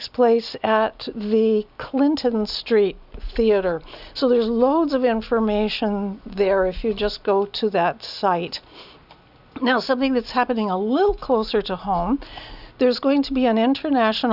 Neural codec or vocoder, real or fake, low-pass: none; real; 5.4 kHz